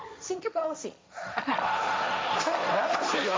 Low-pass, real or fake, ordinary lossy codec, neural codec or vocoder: none; fake; none; codec, 16 kHz, 1.1 kbps, Voila-Tokenizer